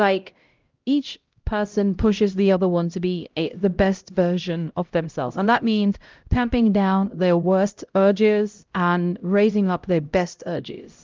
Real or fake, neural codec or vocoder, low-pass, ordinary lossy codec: fake; codec, 16 kHz, 0.5 kbps, X-Codec, HuBERT features, trained on LibriSpeech; 7.2 kHz; Opus, 32 kbps